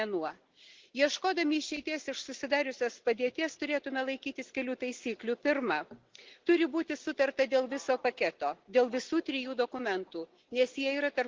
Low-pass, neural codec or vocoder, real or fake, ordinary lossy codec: 7.2 kHz; none; real; Opus, 16 kbps